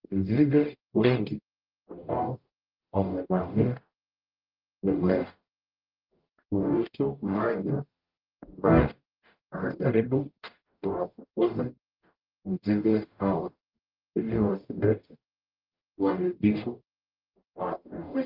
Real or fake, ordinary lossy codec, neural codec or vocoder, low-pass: fake; Opus, 32 kbps; codec, 44.1 kHz, 0.9 kbps, DAC; 5.4 kHz